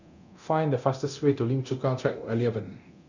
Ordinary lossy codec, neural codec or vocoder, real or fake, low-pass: none; codec, 24 kHz, 0.9 kbps, DualCodec; fake; 7.2 kHz